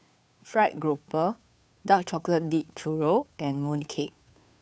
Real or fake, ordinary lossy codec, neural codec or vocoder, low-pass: fake; none; codec, 16 kHz, 2 kbps, FunCodec, trained on Chinese and English, 25 frames a second; none